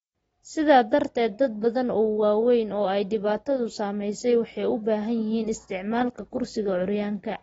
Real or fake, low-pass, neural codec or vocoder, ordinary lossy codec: real; 19.8 kHz; none; AAC, 24 kbps